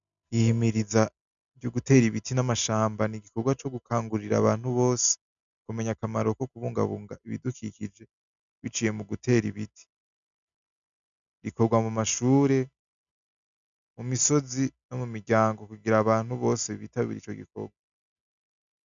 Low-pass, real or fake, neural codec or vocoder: 7.2 kHz; real; none